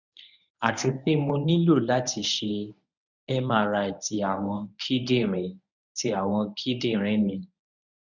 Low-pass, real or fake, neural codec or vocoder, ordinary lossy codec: 7.2 kHz; fake; codec, 24 kHz, 0.9 kbps, WavTokenizer, medium speech release version 1; none